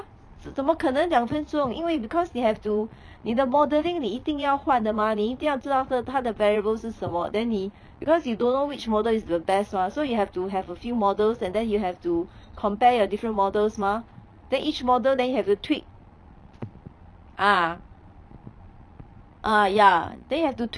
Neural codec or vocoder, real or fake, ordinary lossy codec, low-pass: vocoder, 22.05 kHz, 80 mel bands, Vocos; fake; none; none